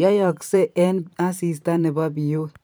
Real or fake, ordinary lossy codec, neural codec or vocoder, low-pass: fake; none; vocoder, 44.1 kHz, 128 mel bands every 512 samples, BigVGAN v2; none